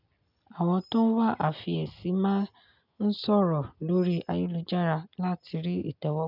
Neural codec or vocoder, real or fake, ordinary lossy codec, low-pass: none; real; none; 5.4 kHz